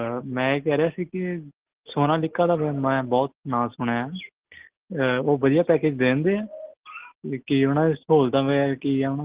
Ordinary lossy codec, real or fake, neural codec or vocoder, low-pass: Opus, 16 kbps; real; none; 3.6 kHz